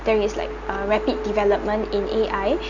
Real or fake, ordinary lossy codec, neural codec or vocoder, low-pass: real; none; none; 7.2 kHz